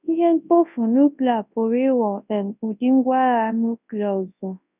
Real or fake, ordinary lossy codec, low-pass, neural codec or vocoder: fake; none; 3.6 kHz; codec, 24 kHz, 0.9 kbps, WavTokenizer, large speech release